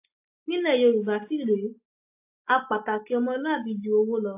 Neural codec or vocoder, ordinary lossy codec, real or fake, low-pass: none; AAC, 24 kbps; real; 3.6 kHz